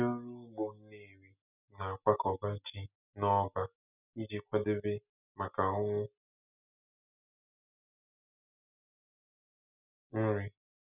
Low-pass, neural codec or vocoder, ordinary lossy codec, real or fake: 3.6 kHz; none; none; real